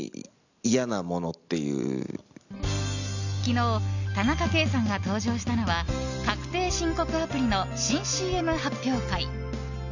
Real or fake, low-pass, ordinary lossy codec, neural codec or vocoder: real; 7.2 kHz; none; none